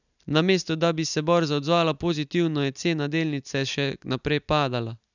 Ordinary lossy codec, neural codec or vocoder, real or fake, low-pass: none; none; real; 7.2 kHz